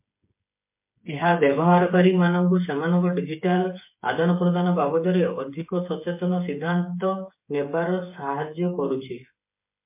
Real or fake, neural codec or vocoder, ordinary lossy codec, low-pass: fake; codec, 16 kHz, 8 kbps, FreqCodec, smaller model; MP3, 24 kbps; 3.6 kHz